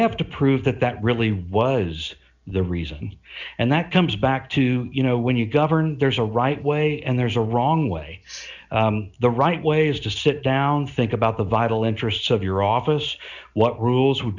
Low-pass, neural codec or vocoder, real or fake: 7.2 kHz; none; real